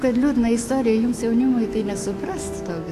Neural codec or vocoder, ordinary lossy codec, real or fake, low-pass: autoencoder, 48 kHz, 128 numbers a frame, DAC-VAE, trained on Japanese speech; AAC, 64 kbps; fake; 14.4 kHz